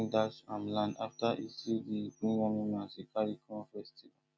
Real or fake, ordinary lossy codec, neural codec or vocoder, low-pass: real; none; none; none